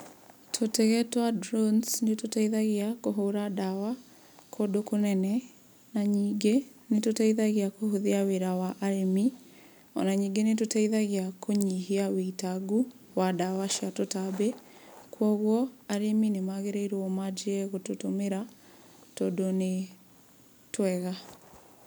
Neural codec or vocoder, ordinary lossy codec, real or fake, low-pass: none; none; real; none